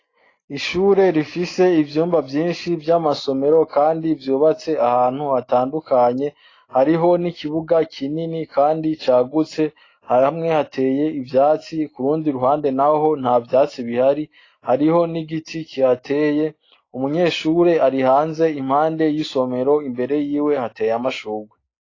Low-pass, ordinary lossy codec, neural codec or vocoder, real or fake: 7.2 kHz; AAC, 32 kbps; none; real